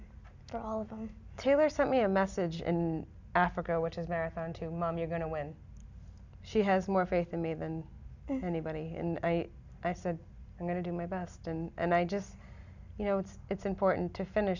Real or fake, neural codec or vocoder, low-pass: real; none; 7.2 kHz